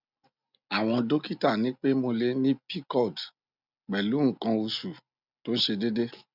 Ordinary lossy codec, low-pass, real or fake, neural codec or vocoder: none; 5.4 kHz; real; none